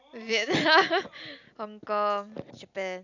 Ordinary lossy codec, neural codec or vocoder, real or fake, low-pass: none; none; real; 7.2 kHz